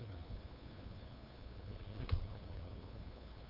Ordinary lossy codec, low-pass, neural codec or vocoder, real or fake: none; 5.4 kHz; codec, 16 kHz, 2 kbps, FunCodec, trained on LibriTTS, 25 frames a second; fake